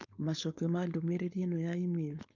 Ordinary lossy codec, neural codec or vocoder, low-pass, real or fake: none; codec, 16 kHz, 4.8 kbps, FACodec; 7.2 kHz; fake